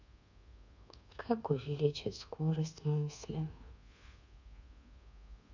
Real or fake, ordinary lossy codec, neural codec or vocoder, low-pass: fake; none; codec, 24 kHz, 1.2 kbps, DualCodec; 7.2 kHz